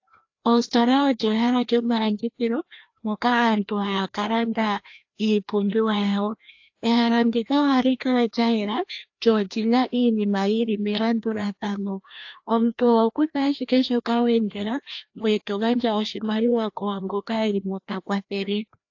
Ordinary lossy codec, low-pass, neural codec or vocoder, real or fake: AAC, 48 kbps; 7.2 kHz; codec, 16 kHz, 1 kbps, FreqCodec, larger model; fake